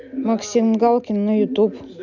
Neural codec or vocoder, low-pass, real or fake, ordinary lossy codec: none; 7.2 kHz; real; none